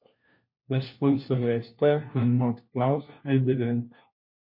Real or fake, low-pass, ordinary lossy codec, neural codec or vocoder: fake; 5.4 kHz; MP3, 32 kbps; codec, 16 kHz, 1 kbps, FunCodec, trained on LibriTTS, 50 frames a second